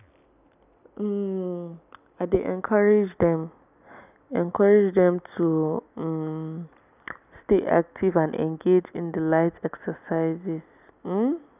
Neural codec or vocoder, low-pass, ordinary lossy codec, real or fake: none; 3.6 kHz; none; real